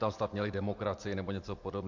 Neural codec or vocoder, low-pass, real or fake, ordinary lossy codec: none; 7.2 kHz; real; MP3, 48 kbps